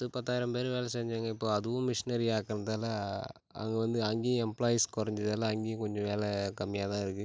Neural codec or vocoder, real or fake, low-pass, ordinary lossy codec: none; real; none; none